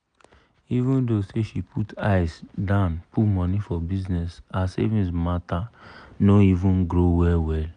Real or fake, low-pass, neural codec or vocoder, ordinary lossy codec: real; 9.9 kHz; none; none